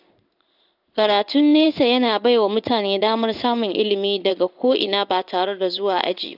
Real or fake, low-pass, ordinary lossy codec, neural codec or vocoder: fake; 5.4 kHz; none; codec, 16 kHz in and 24 kHz out, 1 kbps, XY-Tokenizer